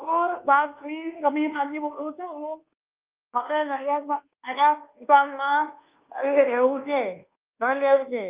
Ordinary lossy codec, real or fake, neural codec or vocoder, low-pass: Opus, 24 kbps; fake; codec, 24 kHz, 1.2 kbps, DualCodec; 3.6 kHz